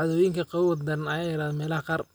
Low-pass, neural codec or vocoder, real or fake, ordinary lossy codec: none; none; real; none